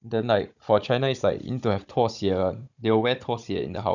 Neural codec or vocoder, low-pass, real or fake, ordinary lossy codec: codec, 16 kHz, 16 kbps, FunCodec, trained on Chinese and English, 50 frames a second; 7.2 kHz; fake; none